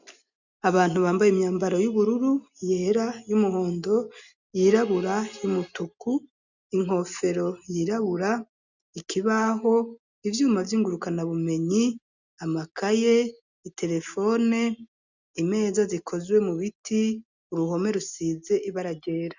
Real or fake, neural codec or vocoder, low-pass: real; none; 7.2 kHz